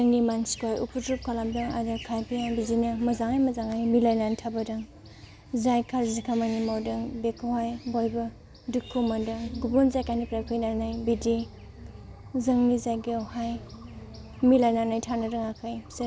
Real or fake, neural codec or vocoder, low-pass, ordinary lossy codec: real; none; none; none